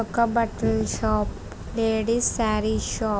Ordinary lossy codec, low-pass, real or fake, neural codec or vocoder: none; none; real; none